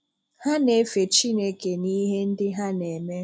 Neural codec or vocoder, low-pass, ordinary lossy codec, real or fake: none; none; none; real